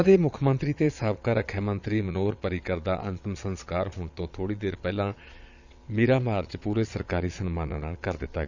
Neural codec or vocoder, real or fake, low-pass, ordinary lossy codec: vocoder, 22.05 kHz, 80 mel bands, Vocos; fake; 7.2 kHz; none